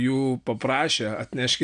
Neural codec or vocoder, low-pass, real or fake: none; 9.9 kHz; real